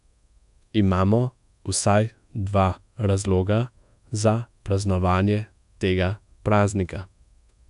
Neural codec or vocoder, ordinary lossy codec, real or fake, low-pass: codec, 24 kHz, 1.2 kbps, DualCodec; none; fake; 10.8 kHz